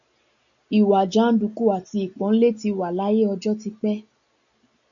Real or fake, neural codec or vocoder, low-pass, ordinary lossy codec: real; none; 7.2 kHz; MP3, 96 kbps